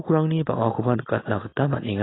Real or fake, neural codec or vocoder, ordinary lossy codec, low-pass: real; none; AAC, 16 kbps; 7.2 kHz